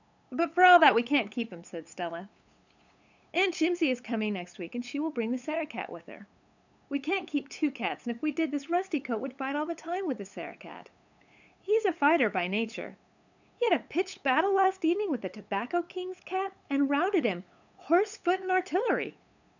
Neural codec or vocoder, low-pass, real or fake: codec, 16 kHz, 8 kbps, FunCodec, trained on LibriTTS, 25 frames a second; 7.2 kHz; fake